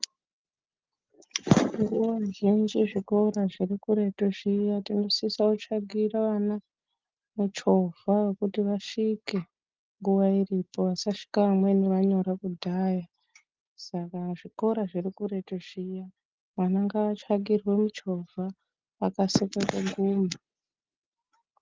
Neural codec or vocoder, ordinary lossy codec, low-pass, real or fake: none; Opus, 32 kbps; 7.2 kHz; real